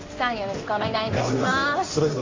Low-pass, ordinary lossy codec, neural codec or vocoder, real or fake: 7.2 kHz; AAC, 32 kbps; codec, 16 kHz in and 24 kHz out, 1 kbps, XY-Tokenizer; fake